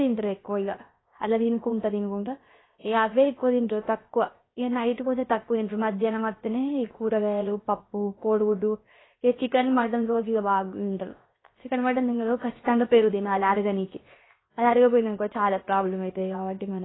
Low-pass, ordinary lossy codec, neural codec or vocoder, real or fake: 7.2 kHz; AAC, 16 kbps; codec, 16 kHz, 0.7 kbps, FocalCodec; fake